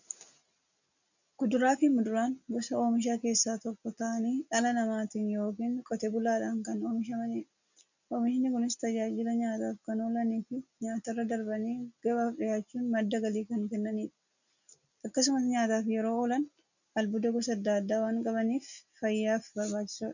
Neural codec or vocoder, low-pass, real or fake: none; 7.2 kHz; real